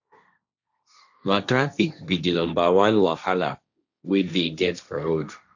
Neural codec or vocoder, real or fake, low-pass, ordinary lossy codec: codec, 16 kHz, 1.1 kbps, Voila-Tokenizer; fake; 7.2 kHz; none